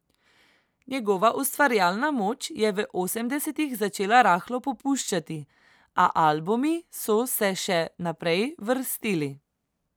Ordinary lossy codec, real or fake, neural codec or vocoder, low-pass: none; real; none; none